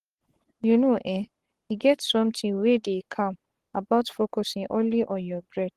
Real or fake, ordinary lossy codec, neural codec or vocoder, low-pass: fake; Opus, 16 kbps; codec, 44.1 kHz, 7.8 kbps, Pupu-Codec; 14.4 kHz